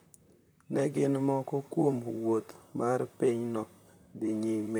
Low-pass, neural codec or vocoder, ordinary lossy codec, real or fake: none; vocoder, 44.1 kHz, 128 mel bands, Pupu-Vocoder; none; fake